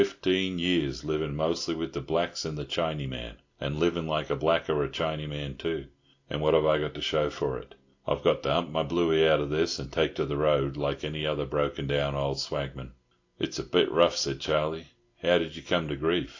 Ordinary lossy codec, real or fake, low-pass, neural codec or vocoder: AAC, 48 kbps; real; 7.2 kHz; none